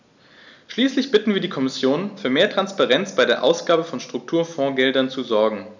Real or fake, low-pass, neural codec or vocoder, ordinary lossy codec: real; 7.2 kHz; none; none